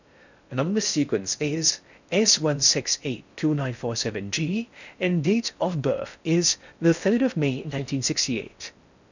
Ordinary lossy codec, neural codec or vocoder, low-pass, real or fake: none; codec, 16 kHz in and 24 kHz out, 0.6 kbps, FocalCodec, streaming, 4096 codes; 7.2 kHz; fake